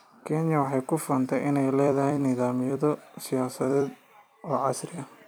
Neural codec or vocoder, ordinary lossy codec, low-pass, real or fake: vocoder, 44.1 kHz, 128 mel bands every 256 samples, BigVGAN v2; none; none; fake